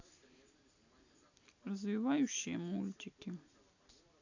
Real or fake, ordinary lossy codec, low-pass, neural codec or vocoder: real; AAC, 48 kbps; 7.2 kHz; none